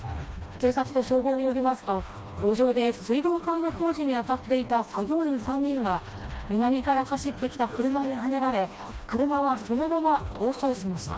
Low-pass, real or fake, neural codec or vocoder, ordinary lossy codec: none; fake; codec, 16 kHz, 1 kbps, FreqCodec, smaller model; none